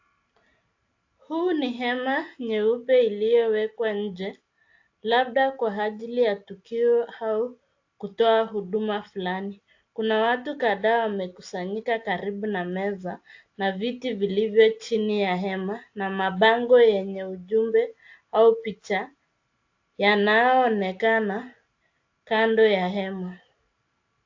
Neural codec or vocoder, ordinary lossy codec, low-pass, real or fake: none; AAC, 48 kbps; 7.2 kHz; real